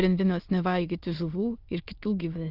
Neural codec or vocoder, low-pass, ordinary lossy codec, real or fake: autoencoder, 22.05 kHz, a latent of 192 numbers a frame, VITS, trained on many speakers; 5.4 kHz; Opus, 24 kbps; fake